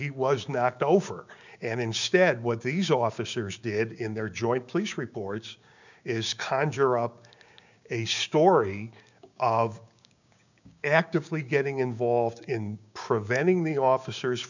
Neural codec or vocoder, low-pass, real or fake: codec, 16 kHz, 6 kbps, DAC; 7.2 kHz; fake